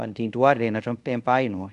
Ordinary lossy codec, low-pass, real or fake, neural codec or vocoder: none; 10.8 kHz; fake; codec, 24 kHz, 0.5 kbps, DualCodec